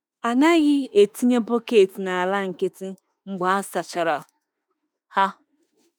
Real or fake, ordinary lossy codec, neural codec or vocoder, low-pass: fake; none; autoencoder, 48 kHz, 32 numbers a frame, DAC-VAE, trained on Japanese speech; none